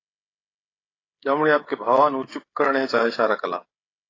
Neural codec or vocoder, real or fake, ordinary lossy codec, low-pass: codec, 16 kHz, 16 kbps, FreqCodec, smaller model; fake; AAC, 32 kbps; 7.2 kHz